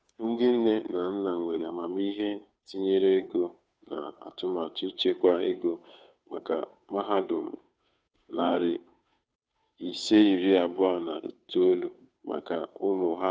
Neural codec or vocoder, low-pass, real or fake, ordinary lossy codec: codec, 16 kHz, 2 kbps, FunCodec, trained on Chinese and English, 25 frames a second; none; fake; none